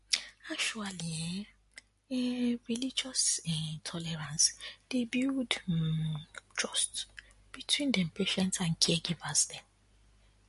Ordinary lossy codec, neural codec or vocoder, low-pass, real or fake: MP3, 48 kbps; none; 14.4 kHz; real